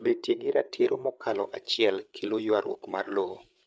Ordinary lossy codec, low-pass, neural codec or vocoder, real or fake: none; none; codec, 16 kHz, 8 kbps, FreqCodec, larger model; fake